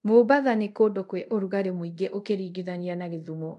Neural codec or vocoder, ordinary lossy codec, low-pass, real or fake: codec, 24 kHz, 0.5 kbps, DualCodec; MP3, 64 kbps; 10.8 kHz; fake